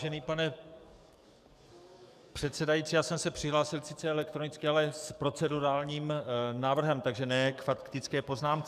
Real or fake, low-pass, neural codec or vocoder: fake; 14.4 kHz; codec, 44.1 kHz, 7.8 kbps, DAC